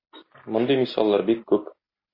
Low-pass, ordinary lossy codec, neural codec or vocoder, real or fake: 5.4 kHz; MP3, 24 kbps; none; real